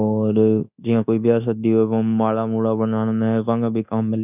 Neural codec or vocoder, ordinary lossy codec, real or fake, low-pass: codec, 24 kHz, 1.2 kbps, DualCodec; none; fake; 3.6 kHz